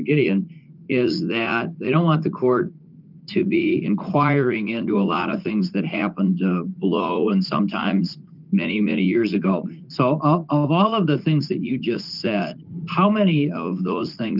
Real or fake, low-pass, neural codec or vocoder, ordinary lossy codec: fake; 5.4 kHz; vocoder, 44.1 kHz, 80 mel bands, Vocos; Opus, 32 kbps